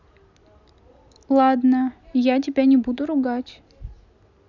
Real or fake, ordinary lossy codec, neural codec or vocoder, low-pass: real; none; none; 7.2 kHz